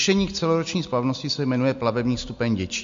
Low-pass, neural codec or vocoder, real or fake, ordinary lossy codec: 7.2 kHz; none; real; MP3, 48 kbps